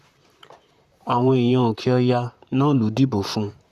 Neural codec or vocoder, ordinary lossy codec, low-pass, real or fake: vocoder, 44.1 kHz, 128 mel bands, Pupu-Vocoder; Opus, 64 kbps; 14.4 kHz; fake